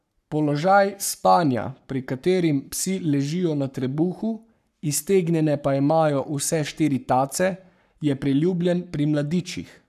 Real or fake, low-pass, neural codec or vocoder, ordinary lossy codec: fake; 14.4 kHz; codec, 44.1 kHz, 7.8 kbps, Pupu-Codec; none